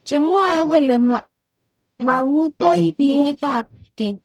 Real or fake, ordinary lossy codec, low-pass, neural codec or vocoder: fake; none; 19.8 kHz; codec, 44.1 kHz, 0.9 kbps, DAC